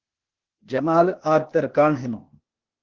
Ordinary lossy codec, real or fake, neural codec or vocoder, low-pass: Opus, 16 kbps; fake; codec, 16 kHz, 0.8 kbps, ZipCodec; 7.2 kHz